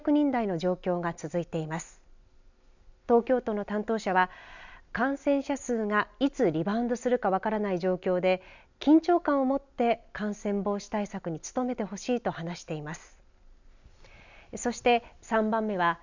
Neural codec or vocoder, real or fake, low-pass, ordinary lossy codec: none; real; 7.2 kHz; none